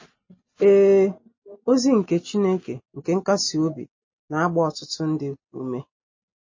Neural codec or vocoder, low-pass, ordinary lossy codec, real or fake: none; 7.2 kHz; MP3, 32 kbps; real